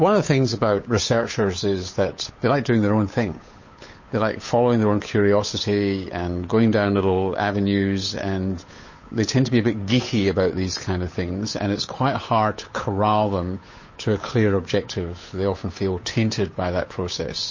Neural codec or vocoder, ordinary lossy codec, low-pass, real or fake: codec, 16 kHz, 8 kbps, FunCodec, trained on Chinese and English, 25 frames a second; MP3, 32 kbps; 7.2 kHz; fake